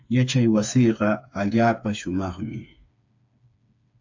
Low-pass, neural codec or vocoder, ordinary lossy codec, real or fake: 7.2 kHz; codec, 16 kHz, 4 kbps, FreqCodec, smaller model; AAC, 48 kbps; fake